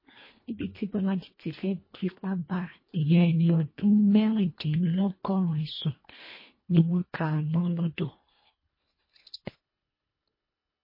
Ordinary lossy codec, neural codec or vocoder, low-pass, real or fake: MP3, 24 kbps; codec, 24 kHz, 1.5 kbps, HILCodec; 5.4 kHz; fake